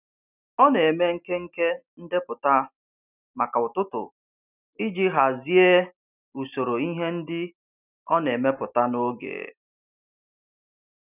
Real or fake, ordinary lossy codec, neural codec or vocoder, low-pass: real; none; none; 3.6 kHz